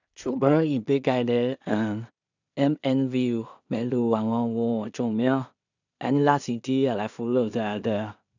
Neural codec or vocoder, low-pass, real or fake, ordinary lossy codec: codec, 16 kHz in and 24 kHz out, 0.4 kbps, LongCat-Audio-Codec, two codebook decoder; 7.2 kHz; fake; none